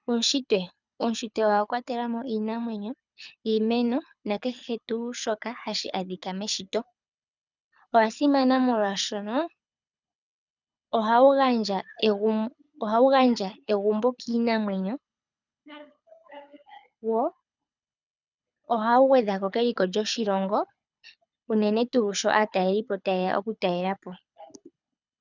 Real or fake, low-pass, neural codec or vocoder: fake; 7.2 kHz; codec, 24 kHz, 6 kbps, HILCodec